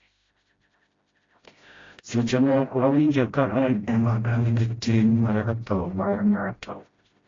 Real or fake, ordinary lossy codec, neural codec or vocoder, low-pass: fake; AAC, 32 kbps; codec, 16 kHz, 0.5 kbps, FreqCodec, smaller model; 7.2 kHz